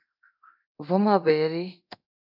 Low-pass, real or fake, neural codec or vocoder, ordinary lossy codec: 5.4 kHz; fake; codec, 24 kHz, 0.9 kbps, DualCodec; AAC, 48 kbps